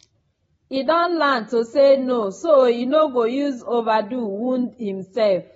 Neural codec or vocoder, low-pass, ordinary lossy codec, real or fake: none; 10.8 kHz; AAC, 24 kbps; real